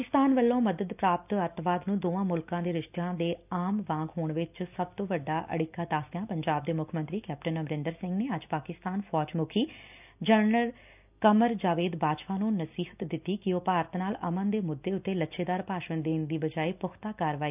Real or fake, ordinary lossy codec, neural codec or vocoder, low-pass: real; none; none; 3.6 kHz